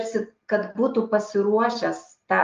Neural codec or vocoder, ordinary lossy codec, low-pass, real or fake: none; Opus, 32 kbps; 7.2 kHz; real